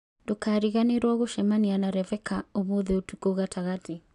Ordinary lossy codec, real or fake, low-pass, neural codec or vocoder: AAC, 96 kbps; real; 10.8 kHz; none